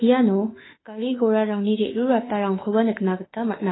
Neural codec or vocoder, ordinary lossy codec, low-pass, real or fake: autoencoder, 48 kHz, 32 numbers a frame, DAC-VAE, trained on Japanese speech; AAC, 16 kbps; 7.2 kHz; fake